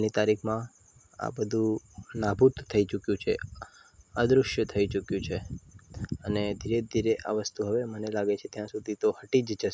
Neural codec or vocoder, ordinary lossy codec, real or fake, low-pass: none; none; real; none